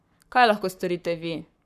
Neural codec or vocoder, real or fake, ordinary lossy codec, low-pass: codec, 44.1 kHz, 7.8 kbps, Pupu-Codec; fake; none; 14.4 kHz